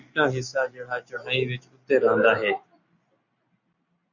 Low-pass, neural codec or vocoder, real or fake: 7.2 kHz; none; real